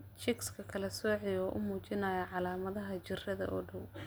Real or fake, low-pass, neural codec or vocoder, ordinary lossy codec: real; none; none; none